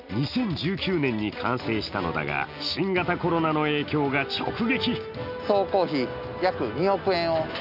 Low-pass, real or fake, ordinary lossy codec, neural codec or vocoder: 5.4 kHz; real; none; none